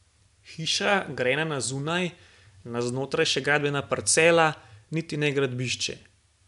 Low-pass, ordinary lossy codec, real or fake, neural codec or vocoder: 10.8 kHz; MP3, 96 kbps; real; none